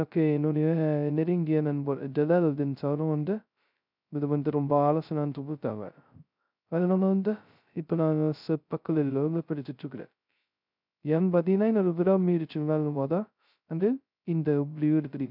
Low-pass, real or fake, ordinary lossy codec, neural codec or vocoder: 5.4 kHz; fake; none; codec, 16 kHz, 0.2 kbps, FocalCodec